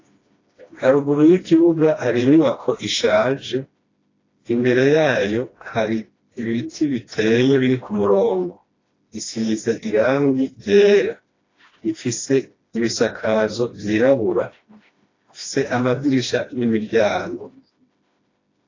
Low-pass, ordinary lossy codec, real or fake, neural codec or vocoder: 7.2 kHz; AAC, 32 kbps; fake; codec, 16 kHz, 1 kbps, FreqCodec, smaller model